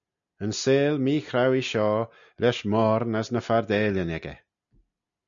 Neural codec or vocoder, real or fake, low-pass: none; real; 7.2 kHz